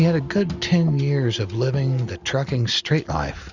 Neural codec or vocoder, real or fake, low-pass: none; real; 7.2 kHz